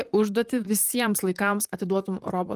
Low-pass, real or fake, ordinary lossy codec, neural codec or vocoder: 14.4 kHz; fake; Opus, 32 kbps; vocoder, 44.1 kHz, 128 mel bands, Pupu-Vocoder